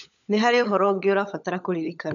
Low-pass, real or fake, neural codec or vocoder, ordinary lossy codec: 7.2 kHz; fake; codec, 16 kHz, 16 kbps, FunCodec, trained on LibriTTS, 50 frames a second; none